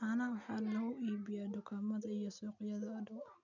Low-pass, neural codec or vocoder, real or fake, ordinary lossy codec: 7.2 kHz; none; real; none